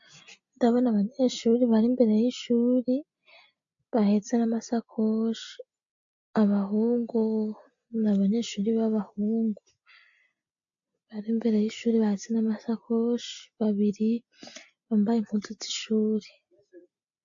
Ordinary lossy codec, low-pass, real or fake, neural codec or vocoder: AAC, 64 kbps; 7.2 kHz; real; none